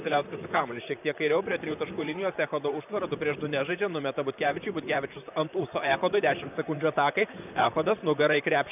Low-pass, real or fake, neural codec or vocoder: 3.6 kHz; fake; vocoder, 44.1 kHz, 128 mel bands, Pupu-Vocoder